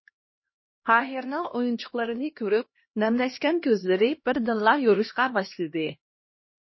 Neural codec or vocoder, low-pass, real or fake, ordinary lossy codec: codec, 16 kHz, 1 kbps, X-Codec, HuBERT features, trained on LibriSpeech; 7.2 kHz; fake; MP3, 24 kbps